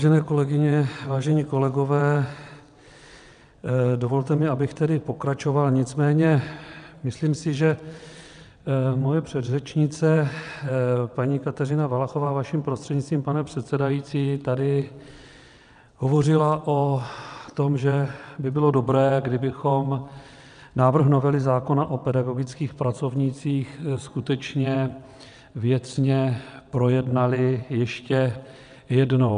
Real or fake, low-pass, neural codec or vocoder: fake; 9.9 kHz; vocoder, 22.05 kHz, 80 mel bands, WaveNeXt